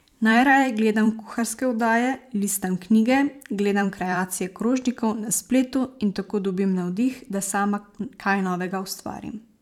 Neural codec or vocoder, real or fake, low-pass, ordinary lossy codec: vocoder, 44.1 kHz, 128 mel bands every 512 samples, BigVGAN v2; fake; 19.8 kHz; none